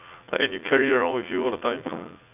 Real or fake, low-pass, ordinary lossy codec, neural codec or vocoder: fake; 3.6 kHz; none; vocoder, 44.1 kHz, 80 mel bands, Vocos